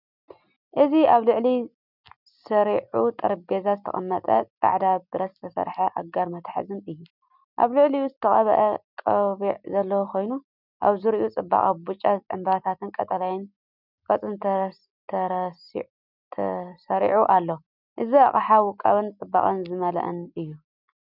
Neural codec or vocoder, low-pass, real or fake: none; 5.4 kHz; real